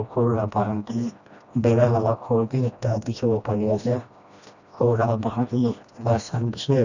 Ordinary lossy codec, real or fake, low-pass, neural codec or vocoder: none; fake; 7.2 kHz; codec, 16 kHz, 1 kbps, FreqCodec, smaller model